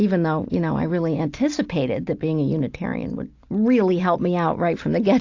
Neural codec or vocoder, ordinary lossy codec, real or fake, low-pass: none; AAC, 48 kbps; real; 7.2 kHz